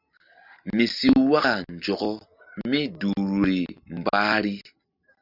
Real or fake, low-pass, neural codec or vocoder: real; 5.4 kHz; none